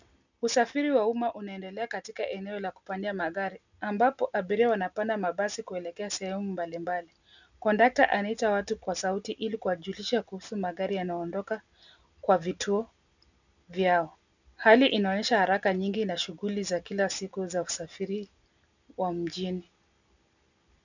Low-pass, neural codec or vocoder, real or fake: 7.2 kHz; none; real